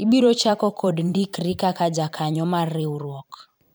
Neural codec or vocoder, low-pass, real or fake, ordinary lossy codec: vocoder, 44.1 kHz, 128 mel bands every 512 samples, BigVGAN v2; none; fake; none